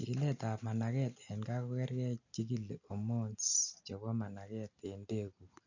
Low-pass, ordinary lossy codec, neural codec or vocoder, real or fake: 7.2 kHz; none; none; real